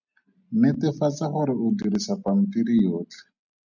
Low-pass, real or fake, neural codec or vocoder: 7.2 kHz; real; none